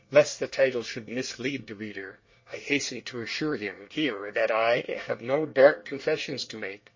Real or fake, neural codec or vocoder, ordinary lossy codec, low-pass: fake; codec, 24 kHz, 1 kbps, SNAC; MP3, 32 kbps; 7.2 kHz